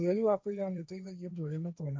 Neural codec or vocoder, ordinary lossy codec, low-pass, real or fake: codec, 16 kHz, 1.1 kbps, Voila-Tokenizer; none; none; fake